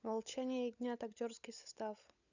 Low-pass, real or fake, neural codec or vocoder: 7.2 kHz; fake; codec, 16 kHz, 16 kbps, FunCodec, trained on LibriTTS, 50 frames a second